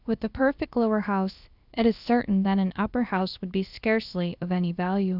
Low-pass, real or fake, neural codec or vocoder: 5.4 kHz; fake; codec, 16 kHz, about 1 kbps, DyCAST, with the encoder's durations